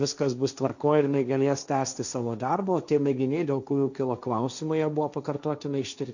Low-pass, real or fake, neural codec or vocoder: 7.2 kHz; fake; codec, 16 kHz, 1.1 kbps, Voila-Tokenizer